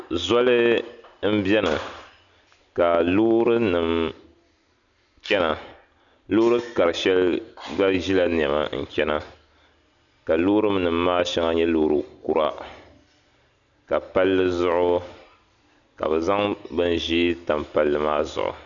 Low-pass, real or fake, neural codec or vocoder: 7.2 kHz; real; none